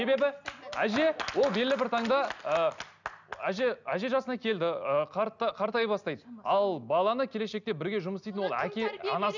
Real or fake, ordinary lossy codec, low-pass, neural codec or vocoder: real; none; 7.2 kHz; none